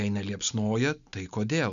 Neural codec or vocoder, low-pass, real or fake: none; 7.2 kHz; real